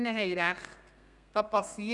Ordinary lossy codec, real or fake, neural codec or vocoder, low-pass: none; fake; codec, 32 kHz, 1.9 kbps, SNAC; 10.8 kHz